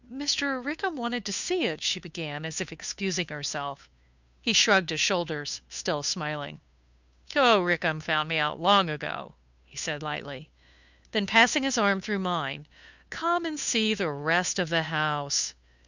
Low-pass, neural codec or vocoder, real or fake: 7.2 kHz; codec, 16 kHz, 2 kbps, FunCodec, trained on Chinese and English, 25 frames a second; fake